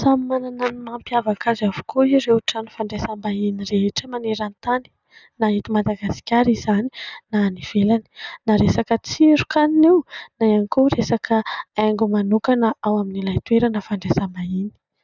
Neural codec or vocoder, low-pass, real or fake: none; 7.2 kHz; real